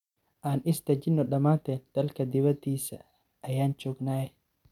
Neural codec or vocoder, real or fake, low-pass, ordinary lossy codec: none; real; 19.8 kHz; none